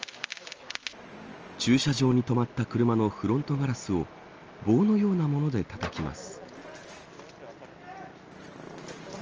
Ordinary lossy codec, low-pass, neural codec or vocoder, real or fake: Opus, 24 kbps; 7.2 kHz; none; real